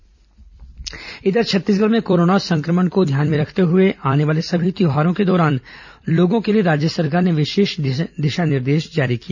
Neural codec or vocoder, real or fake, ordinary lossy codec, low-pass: vocoder, 44.1 kHz, 128 mel bands every 256 samples, BigVGAN v2; fake; none; 7.2 kHz